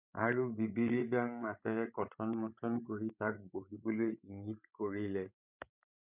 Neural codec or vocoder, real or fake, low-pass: codec, 16 kHz, 16 kbps, FreqCodec, larger model; fake; 3.6 kHz